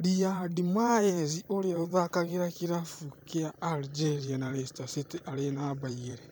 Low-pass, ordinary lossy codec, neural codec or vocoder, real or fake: none; none; vocoder, 44.1 kHz, 128 mel bands every 512 samples, BigVGAN v2; fake